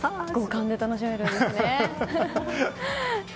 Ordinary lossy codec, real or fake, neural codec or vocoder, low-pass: none; real; none; none